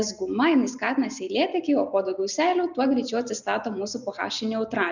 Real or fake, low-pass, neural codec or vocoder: real; 7.2 kHz; none